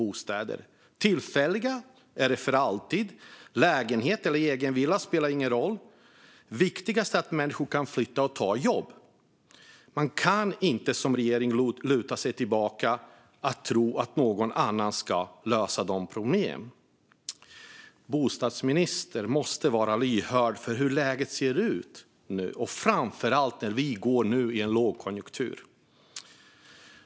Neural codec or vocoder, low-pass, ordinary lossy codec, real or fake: none; none; none; real